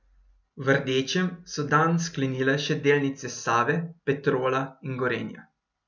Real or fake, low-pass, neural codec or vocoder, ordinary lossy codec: real; 7.2 kHz; none; none